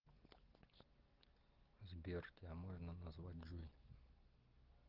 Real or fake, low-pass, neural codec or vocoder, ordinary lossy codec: real; 5.4 kHz; none; Opus, 16 kbps